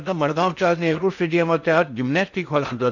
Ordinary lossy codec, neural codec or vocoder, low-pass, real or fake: none; codec, 16 kHz in and 24 kHz out, 0.6 kbps, FocalCodec, streaming, 4096 codes; 7.2 kHz; fake